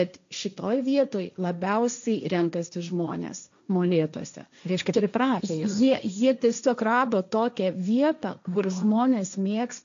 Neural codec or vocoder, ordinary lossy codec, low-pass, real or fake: codec, 16 kHz, 1.1 kbps, Voila-Tokenizer; MP3, 64 kbps; 7.2 kHz; fake